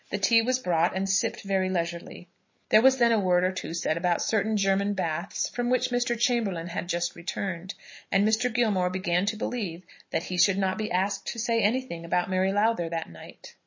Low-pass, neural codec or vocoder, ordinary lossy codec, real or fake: 7.2 kHz; none; MP3, 32 kbps; real